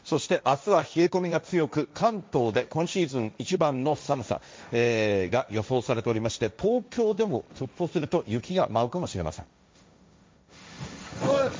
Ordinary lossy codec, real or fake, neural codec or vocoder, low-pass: none; fake; codec, 16 kHz, 1.1 kbps, Voila-Tokenizer; none